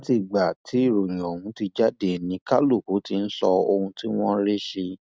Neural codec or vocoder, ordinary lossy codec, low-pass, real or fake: none; none; none; real